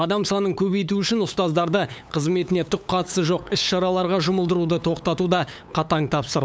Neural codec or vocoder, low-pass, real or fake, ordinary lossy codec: codec, 16 kHz, 8 kbps, FunCodec, trained on LibriTTS, 25 frames a second; none; fake; none